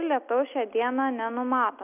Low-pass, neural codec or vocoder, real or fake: 3.6 kHz; none; real